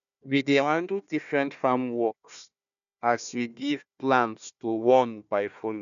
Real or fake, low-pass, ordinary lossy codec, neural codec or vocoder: fake; 7.2 kHz; none; codec, 16 kHz, 1 kbps, FunCodec, trained on Chinese and English, 50 frames a second